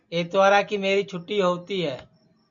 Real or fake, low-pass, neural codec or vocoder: real; 7.2 kHz; none